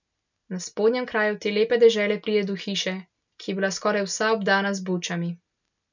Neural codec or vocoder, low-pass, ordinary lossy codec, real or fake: none; 7.2 kHz; none; real